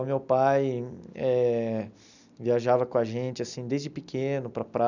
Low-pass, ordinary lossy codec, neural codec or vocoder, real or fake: 7.2 kHz; none; none; real